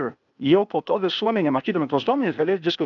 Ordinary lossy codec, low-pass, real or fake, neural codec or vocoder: MP3, 96 kbps; 7.2 kHz; fake; codec, 16 kHz, 0.8 kbps, ZipCodec